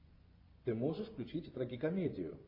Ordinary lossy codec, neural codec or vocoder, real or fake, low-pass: MP3, 32 kbps; vocoder, 24 kHz, 100 mel bands, Vocos; fake; 5.4 kHz